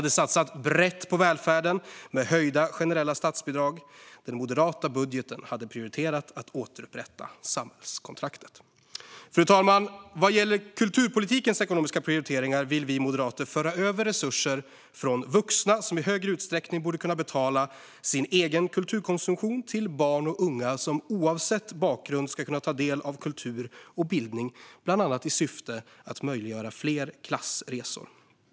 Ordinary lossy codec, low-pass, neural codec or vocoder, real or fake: none; none; none; real